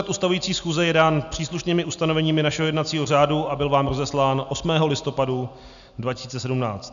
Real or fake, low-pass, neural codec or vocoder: real; 7.2 kHz; none